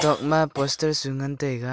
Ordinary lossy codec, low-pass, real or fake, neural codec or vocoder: none; none; real; none